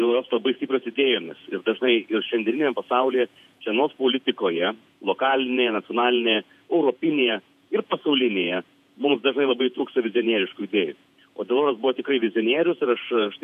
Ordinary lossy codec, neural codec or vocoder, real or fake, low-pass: MP3, 96 kbps; vocoder, 48 kHz, 128 mel bands, Vocos; fake; 14.4 kHz